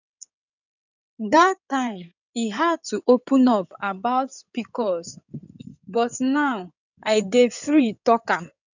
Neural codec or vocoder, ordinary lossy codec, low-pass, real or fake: codec, 16 kHz in and 24 kHz out, 2.2 kbps, FireRedTTS-2 codec; none; 7.2 kHz; fake